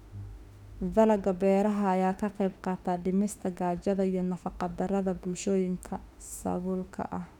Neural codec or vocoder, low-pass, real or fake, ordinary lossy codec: autoencoder, 48 kHz, 32 numbers a frame, DAC-VAE, trained on Japanese speech; 19.8 kHz; fake; none